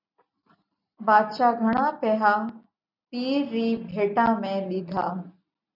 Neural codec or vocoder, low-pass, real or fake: none; 5.4 kHz; real